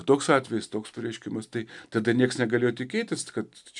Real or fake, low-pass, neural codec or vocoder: real; 10.8 kHz; none